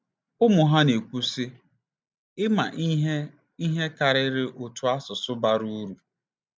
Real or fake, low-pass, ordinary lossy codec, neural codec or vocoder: real; none; none; none